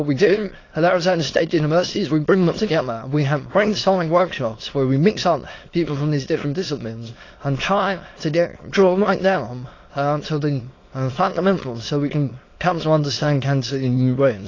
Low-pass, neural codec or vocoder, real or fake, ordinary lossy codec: 7.2 kHz; autoencoder, 22.05 kHz, a latent of 192 numbers a frame, VITS, trained on many speakers; fake; AAC, 32 kbps